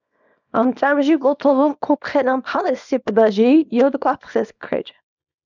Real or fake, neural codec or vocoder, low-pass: fake; codec, 24 kHz, 0.9 kbps, WavTokenizer, small release; 7.2 kHz